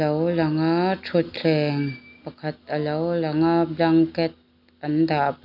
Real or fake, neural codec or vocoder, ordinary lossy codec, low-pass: real; none; none; 5.4 kHz